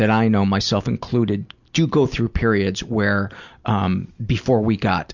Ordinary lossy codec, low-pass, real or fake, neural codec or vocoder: Opus, 64 kbps; 7.2 kHz; real; none